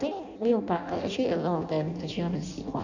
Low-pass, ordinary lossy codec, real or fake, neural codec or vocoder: 7.2 kHz; none; fake; codec, 16 kHz in and 24 kHz out, 0.6 kbps, FireRedTTS-2 codec